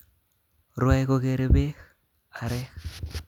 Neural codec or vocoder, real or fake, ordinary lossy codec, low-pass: none; real; none; 19.8 kHz